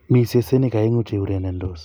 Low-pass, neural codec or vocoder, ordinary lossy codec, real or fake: none; none; none; real